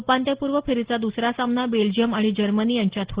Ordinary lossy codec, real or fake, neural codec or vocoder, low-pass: Opus, 16 kbps; real; none; 3.6 kHz